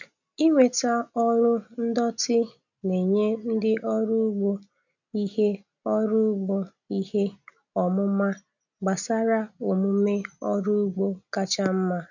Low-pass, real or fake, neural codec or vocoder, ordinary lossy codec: 7.2 kHz; real; none; none